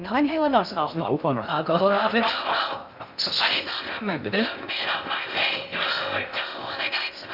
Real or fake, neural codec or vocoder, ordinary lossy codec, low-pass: fake; codec, 16 kHz in and 24 kHz out, 0.6 kbps, FocalCodec, streaming, 2048 codes; none; 5.4 kHz